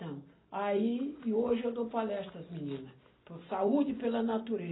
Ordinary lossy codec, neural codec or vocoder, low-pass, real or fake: AAC, 16 kbps; none; 7.2 kHz; real